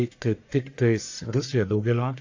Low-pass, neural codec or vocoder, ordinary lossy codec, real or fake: 7.2 kHz; codec, 44.1 kHz, 1.7 kbps, Pupu-Codec; AAC, 48 kbps; fake